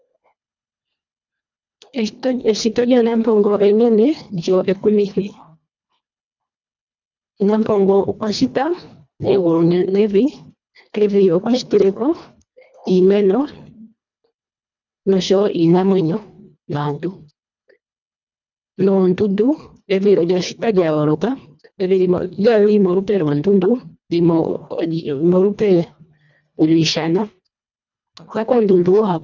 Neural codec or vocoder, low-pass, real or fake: codec, 24 kHz, 1.5 kbps, HILCodec; 7.2 kHz; fake